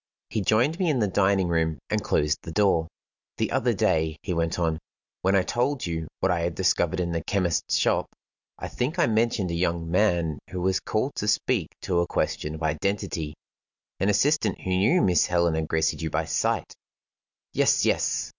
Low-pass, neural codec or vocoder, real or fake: 7.2 kHz; none; real